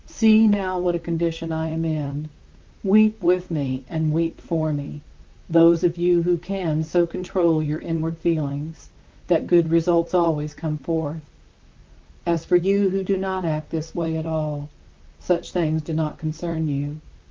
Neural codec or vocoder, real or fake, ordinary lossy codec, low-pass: vocoder, 44.1 kHz, 128 mel bands, Pupu-Vocoder; fake; Opus, 24 kbps; 7.2 kHz